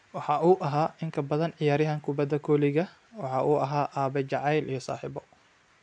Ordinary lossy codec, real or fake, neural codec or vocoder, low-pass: AAC, 64 kbps; real; none; 9.9 kHz